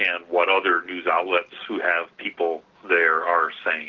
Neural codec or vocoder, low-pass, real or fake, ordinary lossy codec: none; 7.2 kHz; real; Opus, 16 kbps